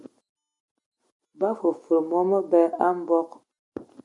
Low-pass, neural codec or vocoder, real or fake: 10.8 kHz; none; real